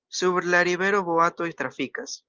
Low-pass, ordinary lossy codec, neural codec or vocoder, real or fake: 7.2 kHz; Opus, 24 kbps; none; real